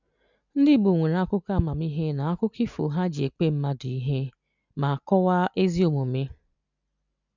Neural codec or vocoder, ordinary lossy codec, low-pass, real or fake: none; none; 7.2 kHz; real